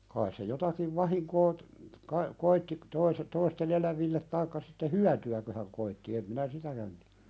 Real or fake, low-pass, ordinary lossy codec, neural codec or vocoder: real; none; none; none